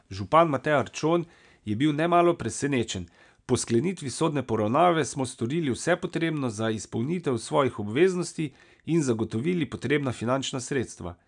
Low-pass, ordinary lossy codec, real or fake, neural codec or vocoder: 9.9 kHz; none; real; none